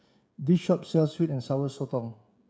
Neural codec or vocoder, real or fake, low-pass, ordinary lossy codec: codec, 16 kHz, 16 kbps, FreqCodec, smaller model; fake; none; none